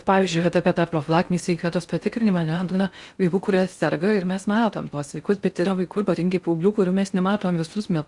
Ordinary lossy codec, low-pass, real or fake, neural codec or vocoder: Opus, 64 kbps; 10.8 kHz; fake; codec, 16 kHz in and 24 kHz out, 0.6 kbps, FocalCodec, streaming, 4096 codes